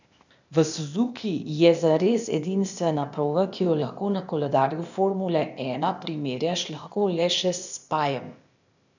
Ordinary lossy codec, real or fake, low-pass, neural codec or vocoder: none; fake; 7.2 kHz; codec, 16 kHz, 0.8 kbps, ZipCodec